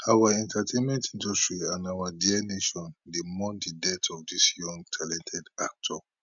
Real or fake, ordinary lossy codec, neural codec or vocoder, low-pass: real; none; none; 7.2 kHz